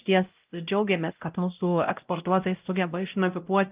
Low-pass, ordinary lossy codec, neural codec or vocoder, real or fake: 3.6 kHz; Opus, 24 kbps; codec, 16 kHz, 0.5 kbps, X-Codec, WavLM features, trained on Multilingual LibriSpeech; fake